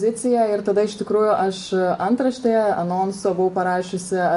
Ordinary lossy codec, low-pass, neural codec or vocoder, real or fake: AAC, 48 kbps; 10.8 kHz; none; real